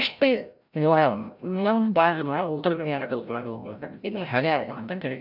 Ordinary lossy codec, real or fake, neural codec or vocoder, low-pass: none; fake; codec, 16 kHz, 0.5 kbps, FreqCodec, larger model; 5.4 kHz